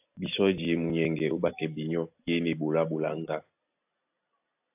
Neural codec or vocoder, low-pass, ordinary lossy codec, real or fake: none; 3.6 kHz; AAC, 32 kbps; real